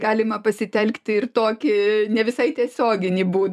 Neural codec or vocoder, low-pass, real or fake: vocoder, 44.1 kHz, 128 mel bands every 256 samples, BigVGAN v2; 14.4 kHz; fake